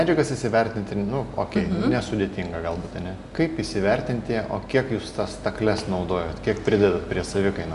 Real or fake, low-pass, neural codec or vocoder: real; 10.8 kHz; none